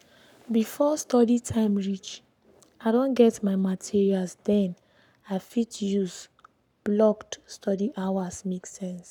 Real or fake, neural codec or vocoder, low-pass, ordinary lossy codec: fake; codec, 44.1 kHz, 7.8 kbps, Pupu-Codec; 19.8 kHz; none